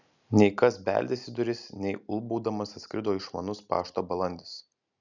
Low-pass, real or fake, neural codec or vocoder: 7.2 kHz; real; none